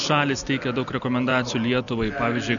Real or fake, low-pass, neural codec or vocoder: real; 7.2 kHz; none